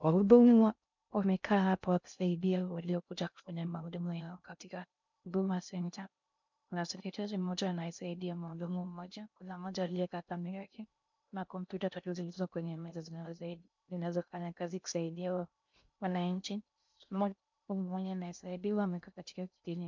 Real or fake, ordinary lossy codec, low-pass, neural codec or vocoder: fake; MP3, 64 kbps; 7.2 kHz; codec, 16 kHz in and 24 kHz out, 0.6 kbps, FocalCodec, streaming, 2048 codes